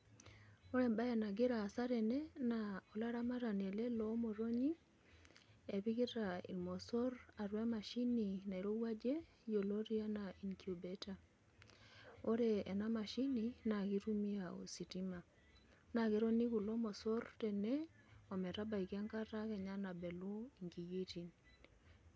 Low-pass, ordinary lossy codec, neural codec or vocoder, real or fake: none; none; none; real